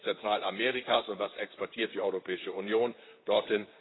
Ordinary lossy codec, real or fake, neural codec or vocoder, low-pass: AAC, 16 kbps; fake; vocoder, 44.1 kHz, 128 mel bands, Pupu-Vocoder; 7.2 kHz